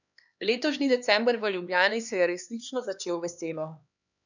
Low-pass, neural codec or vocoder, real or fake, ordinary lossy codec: 7.2 kHz; codec, 16 kHz, 2 kbps, X-Codec, HuBERT features, trained on LibriSpeech; fake; none